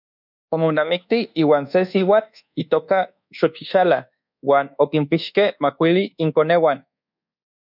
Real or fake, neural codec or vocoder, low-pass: fake; codec, 24 kHz, 1.2 kbps, DualCodec; 5.4 kHz